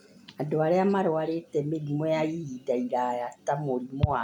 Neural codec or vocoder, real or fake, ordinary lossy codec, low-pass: none; real; none; 19.8 kHz